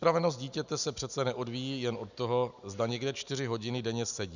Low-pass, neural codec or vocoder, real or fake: 7.2 kHz; none; real